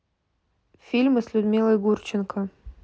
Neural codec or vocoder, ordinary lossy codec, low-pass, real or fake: none; none; none; real